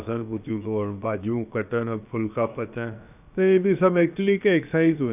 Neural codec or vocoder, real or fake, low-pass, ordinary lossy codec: codec, 16 kHz, about 1 kbps, DyCAST, with the encoder's durations; fake; 3.6 kHz; none